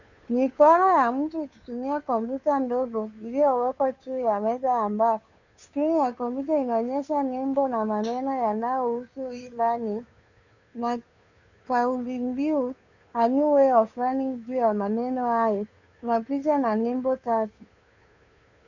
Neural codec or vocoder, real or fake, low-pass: codec, 16 kHz, 2 kbps, FunCodec, trained on Chinese and English, 25 frames a second; fake; 7.2 kHz